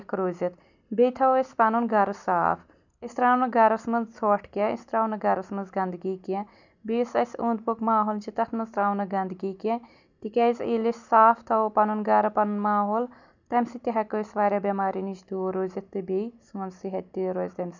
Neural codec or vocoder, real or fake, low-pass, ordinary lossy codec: none; real; 7.2 kHz; none